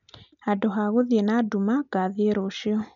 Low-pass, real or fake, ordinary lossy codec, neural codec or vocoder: 7.2 kHz; real; none; none